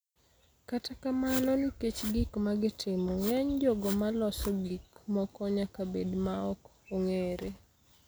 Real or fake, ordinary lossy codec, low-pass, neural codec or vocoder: real; none; none; none